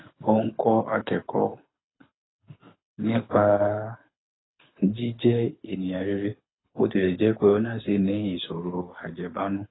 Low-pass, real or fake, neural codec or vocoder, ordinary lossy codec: 7.2 kHz; fake; codec, 24 kHz, 6 kbps, HILCodec; AAC, 16 kbps